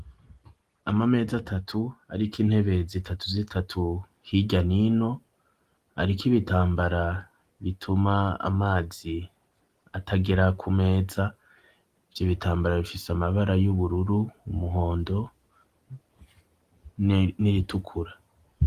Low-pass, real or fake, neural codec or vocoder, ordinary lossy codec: 14.4 kHz; real; none; Opus, 24 kbps